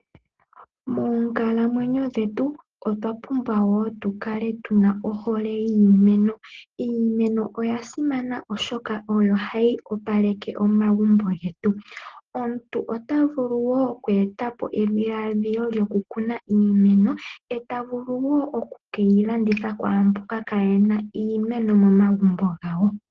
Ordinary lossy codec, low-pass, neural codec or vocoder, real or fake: Opus, 16 kbps; 7.2 kHz; none; real